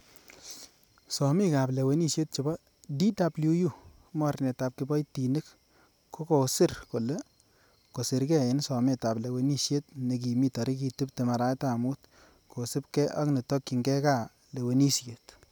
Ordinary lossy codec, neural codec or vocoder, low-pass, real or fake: none; none; none; real